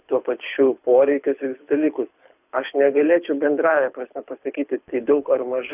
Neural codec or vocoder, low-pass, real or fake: codec, 24 kHz, 6 kbps, HILCodec; 3.6 kHz; fake